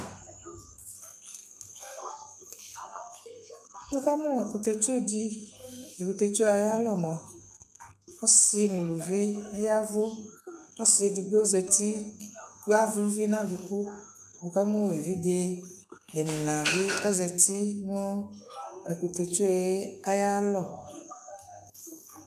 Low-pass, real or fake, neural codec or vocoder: 14.4 kHz; fake; codec, 32 kHz, 1.9 kbps, SNAC